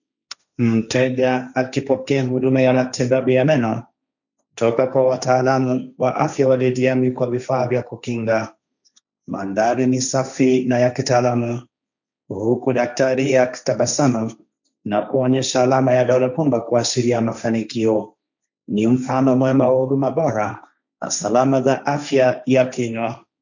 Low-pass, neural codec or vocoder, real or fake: 7.2 kHz; codec, 16 kHz, 1.1 kbps, Voila-Tokenizer; fake